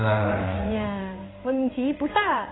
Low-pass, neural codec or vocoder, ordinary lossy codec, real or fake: 7.2 kHz; codec, 16 kHz in and 24 kHz out, 1 kbps, XY-Tokenizer; AAC, 16 kbps; fake